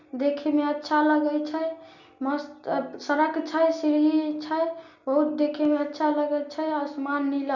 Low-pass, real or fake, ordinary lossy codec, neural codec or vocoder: 7.2 kHz; real; none; none